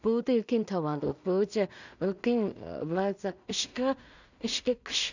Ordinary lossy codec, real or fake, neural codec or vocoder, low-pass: none; fake; codec, 16 kHz in and 24 kHz out, 0.4 kbps, LongCat-Audio-Codec, two codebook decoder; 7.2 kHz